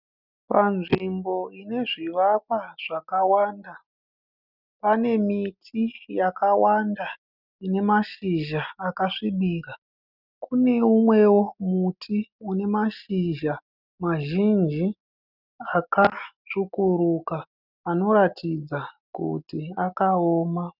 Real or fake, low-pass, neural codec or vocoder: real; 5.4 kHz; none